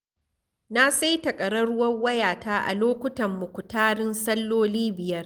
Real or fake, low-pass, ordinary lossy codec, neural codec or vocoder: real; 19.8 kHz; Opus, 24 kbps; none